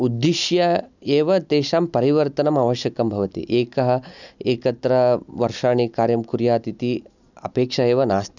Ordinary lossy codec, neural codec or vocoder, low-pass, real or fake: none; none; 7.2 kHz; real